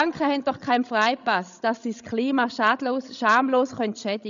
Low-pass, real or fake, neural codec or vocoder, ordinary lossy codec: 7.2 kHz; fake; codec, 16 kHz, 16 kbps, FreqCodec, larger model; none